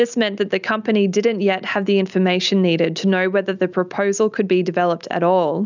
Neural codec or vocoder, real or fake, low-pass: none; real; 7.2 kHz